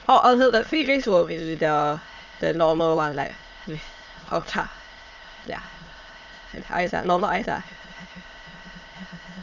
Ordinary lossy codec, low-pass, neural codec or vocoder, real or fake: none; 7.2 kHz; autoencoder, 22.05 kHz, a latent of 192 numbers a frame, VITS, trained on many speakers; fake